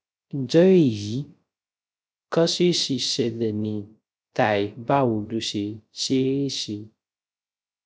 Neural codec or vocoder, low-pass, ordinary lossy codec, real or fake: codec, 16 kHz, 0.3 kbps, FocalCodec; none; none; fake